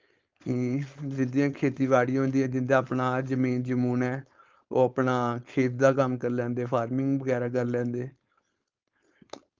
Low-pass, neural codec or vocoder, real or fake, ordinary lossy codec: 7.2 kHz; codec, 16 kHz, 4.8 kbps, FACodec; fake; Opus, 24 kbps